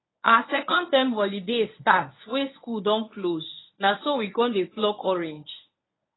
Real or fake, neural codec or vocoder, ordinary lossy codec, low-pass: fake; codec, 24 kHz, 0.9 kbps, WavTokenizer, medium speech release version 1; AAC, 16 kbps; 7.2 kHz